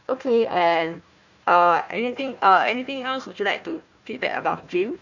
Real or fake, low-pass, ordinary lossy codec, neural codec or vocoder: fake; 7.2 kHz; none; codec, 16 kHz, 1 kbps, FunCodec, trained on Chinese and English, 50 frames a second